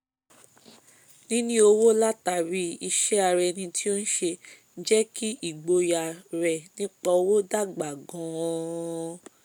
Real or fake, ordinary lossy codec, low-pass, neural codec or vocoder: real; none; none; none